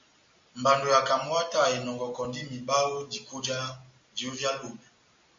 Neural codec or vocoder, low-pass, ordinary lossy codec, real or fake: none; 7.2 kHz; MP3, 48 kbps; real